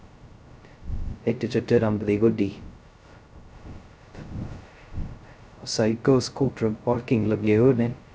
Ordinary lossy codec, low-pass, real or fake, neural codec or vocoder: none; none; fake; codec, 16 kHz, 0.2 kbps, FocalCodec